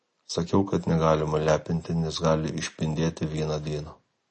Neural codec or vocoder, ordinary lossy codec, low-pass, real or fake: none; MP3, 32 kbps; 10.8 kHz; real